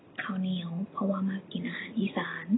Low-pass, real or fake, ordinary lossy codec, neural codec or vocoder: 7.2 kHz; real; AAC, 16 kbps; none